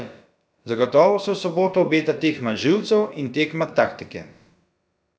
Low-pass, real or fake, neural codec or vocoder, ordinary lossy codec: none; fake; codec, 16 kHz, about 1 kbps, DyCAST, with the encoder's durations; none